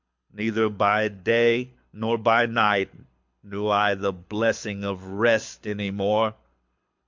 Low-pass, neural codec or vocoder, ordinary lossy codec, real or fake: 7.2 kHz; codec, 24 kHz, 6 kbps, HILCodec; MP3, 64 kbps; fake